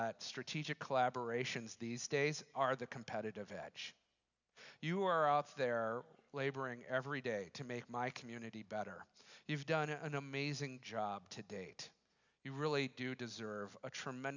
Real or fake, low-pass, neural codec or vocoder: real; 7.2 kHz; none